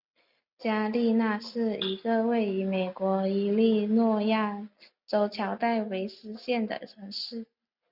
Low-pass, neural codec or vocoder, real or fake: 5.4 kHz; none; real